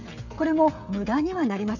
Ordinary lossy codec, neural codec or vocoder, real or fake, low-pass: none; codec, 16 kHz, 16 kbps, FreqCodec, smaller model; fake; 7.2 kHz